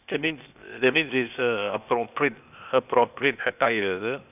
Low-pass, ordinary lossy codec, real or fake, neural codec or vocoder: 3.6 kHz; none; fake; codec, 16 kHz, 0.8 kbps, ZipCodec